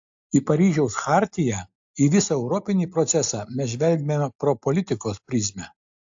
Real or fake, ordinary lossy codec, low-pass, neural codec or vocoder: real; AAC, 64 kbps; 7.2 kHz; none